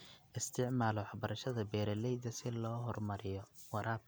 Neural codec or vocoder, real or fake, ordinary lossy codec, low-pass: none; real; none; none